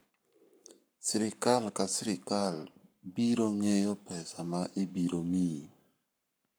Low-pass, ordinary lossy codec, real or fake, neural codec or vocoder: none; none; fake; codec, 44.1 kHz, 7.8 kbps, Pupu-Codec